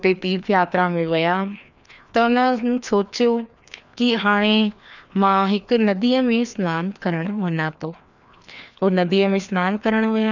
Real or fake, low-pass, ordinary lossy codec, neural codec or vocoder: fake; 7.2 kHz; none; codec, 16 kHz, 2 kbps, FreqCodec, larger model